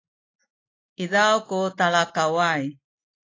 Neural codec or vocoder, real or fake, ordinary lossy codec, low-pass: none; real; AAC, 32 kbps; 7.2 kHz